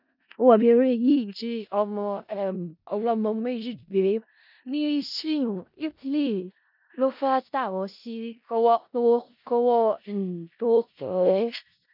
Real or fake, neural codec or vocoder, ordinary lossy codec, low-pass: fake; codec, 16 kHz in and 24 kHz out, 0.4 kbps, LongCat-Audio-Codec, four codebook decoder; none; 5.4 kHz